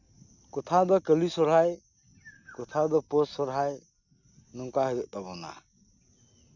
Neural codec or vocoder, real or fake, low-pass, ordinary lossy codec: none; real; 7.2 kHz; none